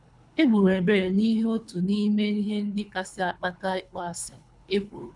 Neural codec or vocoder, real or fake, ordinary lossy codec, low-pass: codec, 24 kHz, 3 kbps, HILCodec; fake; none; 10.8 kHz